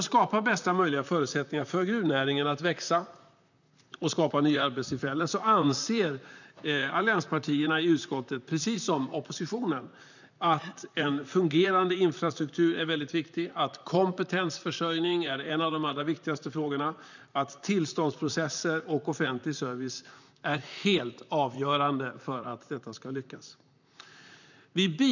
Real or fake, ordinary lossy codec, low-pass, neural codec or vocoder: fake; none; 7.2 kHz; vocoder, 44.1 kHz, 128 mel bands, Pupu-Vocoder